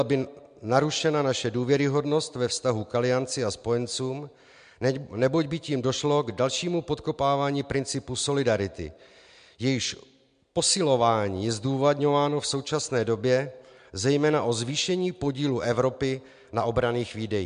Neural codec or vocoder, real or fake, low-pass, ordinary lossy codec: none; real; 9.9 kHz; MP3, 64 kbps